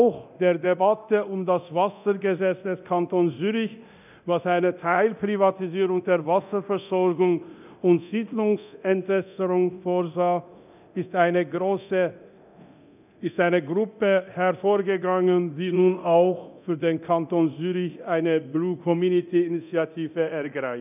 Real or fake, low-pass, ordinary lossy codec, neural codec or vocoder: fake; 3.6 kHz; none; codec, 24 kHz, 0.9 kbps, DualCodec